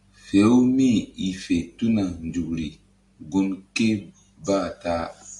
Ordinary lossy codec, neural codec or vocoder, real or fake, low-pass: MP3, 96 kbps; none; real; 10.8 kHz